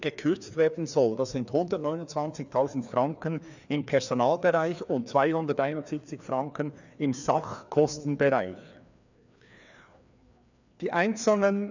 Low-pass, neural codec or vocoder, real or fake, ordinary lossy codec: 7.2 kHz; codec, 16 kHz, 2 kbps, FreqCodec, larger model; fake; none